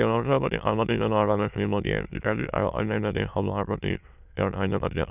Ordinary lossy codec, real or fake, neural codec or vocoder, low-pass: none; fake; autoencoder, 22.05 kHz, a latent of 192 numbers a frame, VITS, trained on many speakers; 3.6 kHz